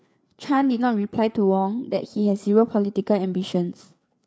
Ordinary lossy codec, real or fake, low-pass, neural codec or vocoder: none; fake; none; codec, 16 kHz, 4 kbps, FreqCodec, larger model